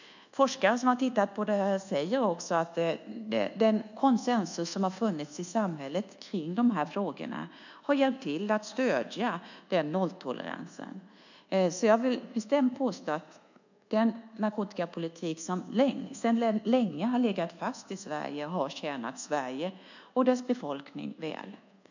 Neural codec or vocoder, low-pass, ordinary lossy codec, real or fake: codec, 24 kHz, 1.2 kbps, DualCodec; 7.2 kHz; none; fake